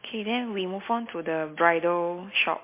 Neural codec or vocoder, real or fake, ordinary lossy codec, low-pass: none; real; MP3, 24 kbps; 3.6 kHz